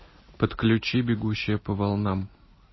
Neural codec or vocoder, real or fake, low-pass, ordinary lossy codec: none; real; 7.2 kHz; MP3, 24 kbps